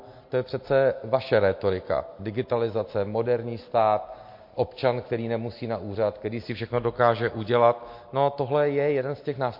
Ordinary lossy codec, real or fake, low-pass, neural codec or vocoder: MP3, 32 kbps; real; 5.4 kHz; none